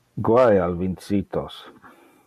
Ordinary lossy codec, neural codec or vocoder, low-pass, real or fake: MP3, 96 kbps; none; 14.4 kHz; real